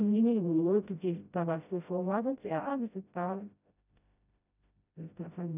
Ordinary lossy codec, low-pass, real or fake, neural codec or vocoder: none; 3.6 kHz; fake; codec, 16 kHz, 0.5 kbps, FreqCodec, smaller model